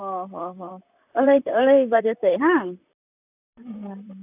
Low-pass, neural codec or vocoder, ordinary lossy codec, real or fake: 3.6 kHz; vocoder, 44.1 kHz, 128 mel bands, Pupu-Vocoder; none; fake